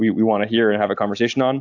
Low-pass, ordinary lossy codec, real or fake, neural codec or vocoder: 7.2 kHz; AAC, 48 kbps; real; none